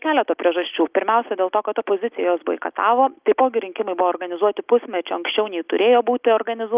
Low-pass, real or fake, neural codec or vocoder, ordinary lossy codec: 3.6 kHz; real; none; Opus, 32 kbps